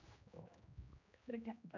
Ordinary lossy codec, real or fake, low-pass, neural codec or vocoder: none; fake; 7.2 kHz; codec, 16 kHz, 1 kbps, X-Codec, HuBERT features, trained on general audio